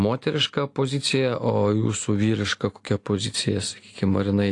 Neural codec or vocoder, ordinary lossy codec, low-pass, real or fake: none; AAC, 48 kbps; 10.8 kHz; real